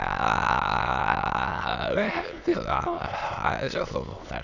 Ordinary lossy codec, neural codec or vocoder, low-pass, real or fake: none; autoencoder, 22.05 kHz, a latent of 192 numbers a frame, VITS, trained on many speakers; 7.2 kHz; fake